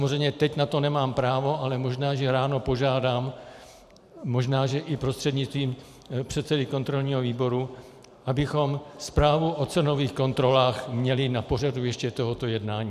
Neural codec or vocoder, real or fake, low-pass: vocoder, 44.1 kHz, 128 mel bands every 512 samples, BigVGAN v2; fake; 14.4 kHz